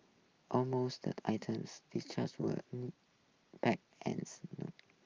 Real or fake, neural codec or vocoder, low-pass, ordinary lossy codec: real; none; 7.2 kHz; Opus, 24 kbps